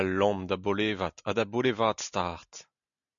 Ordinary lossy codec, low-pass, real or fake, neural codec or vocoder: MP3, 96 kbps; 7.2 kHz; real; none